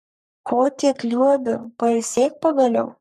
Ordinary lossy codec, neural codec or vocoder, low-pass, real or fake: MP3, 96 kbps; codec, 44.1 kHz, 3.4 kbps, Pupu-Codec; 14.4 kHz; fake